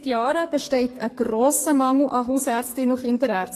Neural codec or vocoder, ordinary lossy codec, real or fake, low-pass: codec, 44.1 kHz, 2.6 kbps, SNAC; AAC, 48 kbps; fake; 14.4 kHz